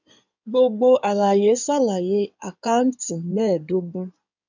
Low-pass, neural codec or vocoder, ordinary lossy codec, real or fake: 7.2 kHz; codec, 16 kHz in and 24 kHz out, 2.2 kbps, FireRedTTS-2 codec; none; fake